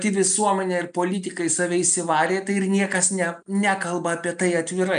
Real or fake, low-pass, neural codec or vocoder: real; 9.9 kHz; none